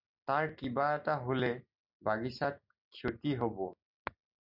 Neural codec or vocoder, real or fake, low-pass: none; real; 5.4 kHz